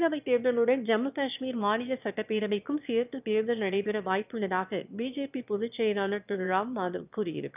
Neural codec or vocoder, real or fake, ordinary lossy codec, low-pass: autoencoder, 22.05 kHz, a latent of 192 numbers a frame, VITS, trained on one speaker; fake; none; 3.6 kHz